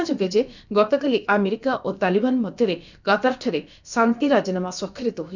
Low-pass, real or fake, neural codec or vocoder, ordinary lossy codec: 7.2 kHz; fake; codec, 16 kHz, about 1 kbps, DyCAST, with the encoder's durations; none